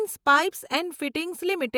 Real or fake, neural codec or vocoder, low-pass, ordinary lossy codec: fake; vocoder, 48 kHz, 128 mel bands, Vocos; none; none